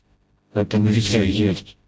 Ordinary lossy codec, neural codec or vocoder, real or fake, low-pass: none; codec, 16 kHz, 0.5 kbps, FreqCodec, smaller model; fake; none